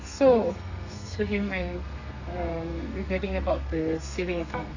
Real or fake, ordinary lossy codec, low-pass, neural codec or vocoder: fake; AAC, 48 kbps; 7.2 kHz; codec, 32 kHz, 1.9 kbps, SNAC